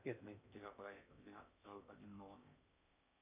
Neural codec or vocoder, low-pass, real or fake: codec, 16 kHz in and 24 kHz out, 0.8 kbps, FocalCodec, streaming, 65536 codes; 3.6 kHz; fake